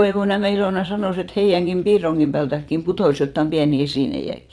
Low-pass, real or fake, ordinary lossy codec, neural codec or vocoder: none; fake; none; vocoder, 22.05 kHz, 80 mel bands, Vocos